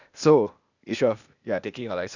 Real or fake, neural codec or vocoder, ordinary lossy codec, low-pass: fake; codec, 16 kHz, 0.8 kbps, ZipCodec; none; 7.2 kHz